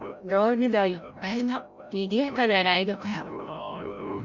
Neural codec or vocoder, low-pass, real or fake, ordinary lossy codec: codec, 16 kHz, 0.5 kbps, FreqCodec, larger model; 7.2 kHz; fake; none